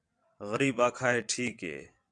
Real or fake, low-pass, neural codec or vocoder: fake; 9.9 kHz; vocoder, 22.05 kHz, 80 mel bands, WaveNeXt